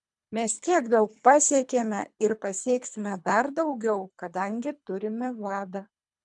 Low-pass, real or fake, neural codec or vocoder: 10.8 kHz; fake; codec, 24 kHz, 3 kbps, HILCodec